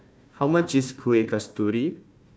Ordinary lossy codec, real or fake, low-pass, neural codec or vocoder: none; fake; none; codec, 16 kHz, 1 kbps, FunCodec, trained on Chinese and English, 50 frames a second